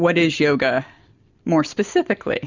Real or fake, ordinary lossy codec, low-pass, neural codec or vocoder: real; Opus, 64 kbps; 7.2 kHz; none